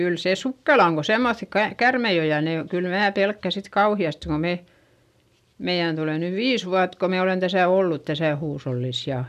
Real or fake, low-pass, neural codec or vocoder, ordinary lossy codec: real; 14.4 kHz; none; none